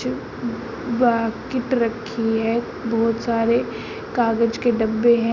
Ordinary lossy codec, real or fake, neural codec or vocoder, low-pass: none; real; none; 7.2 kHz